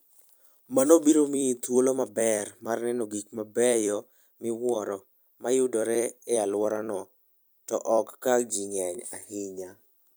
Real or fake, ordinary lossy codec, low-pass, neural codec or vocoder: fake; none; none; vocoder, 44.1 kHz, 128 mel bands every 256 samples, BigVGAN v2